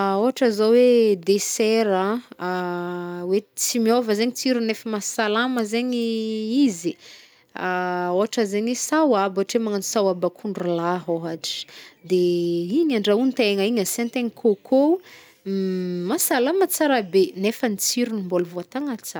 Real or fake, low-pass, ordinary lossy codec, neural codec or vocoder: real; none; none; none